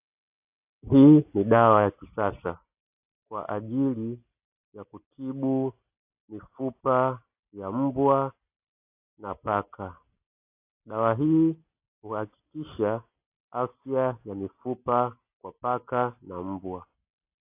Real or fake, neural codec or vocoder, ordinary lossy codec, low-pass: real; none; AAC, 32 kbps; 3.6 kHz